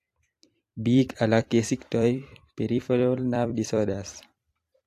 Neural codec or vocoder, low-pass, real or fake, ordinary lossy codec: vocoder, 44.1 kHz, 128 mel bands every 256 samples, BigVGAN v2; 14.4 kHz; fake; AAC, 64 kbps